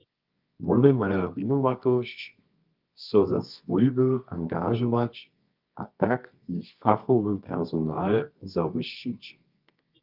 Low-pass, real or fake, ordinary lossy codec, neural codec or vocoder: 5.4 kHz; fake; Opus, 32 kbps; codec, 24 kHz, 0.9 kbps, WavTokenizer, medium music audio release